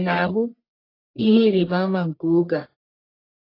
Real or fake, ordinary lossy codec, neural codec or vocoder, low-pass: fake; AAC, 24 kbps; codec, 44.1 kHz, 1.7 kbps, Pupu-Codec; 5.4 kHz